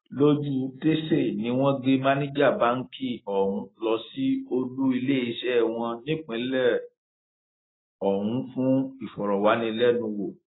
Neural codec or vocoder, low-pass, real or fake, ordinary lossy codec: none; 7.2 kHz; real; AAC, 16 kbps